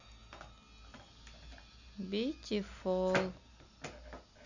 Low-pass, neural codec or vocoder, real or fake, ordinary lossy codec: 7.2 kHz; none; real; none